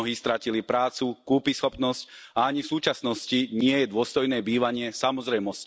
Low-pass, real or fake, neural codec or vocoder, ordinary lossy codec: none; real; none; none